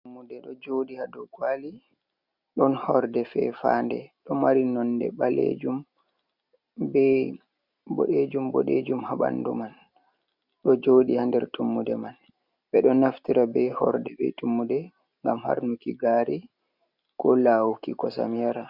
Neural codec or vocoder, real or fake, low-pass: none; real; 5.4 kHz